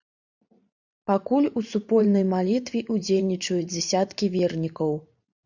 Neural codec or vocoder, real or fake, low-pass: vocoder, 44.1 kHz, 128 mel bands every 512 samples, BigVGAN v2; fake; 7.2 kHz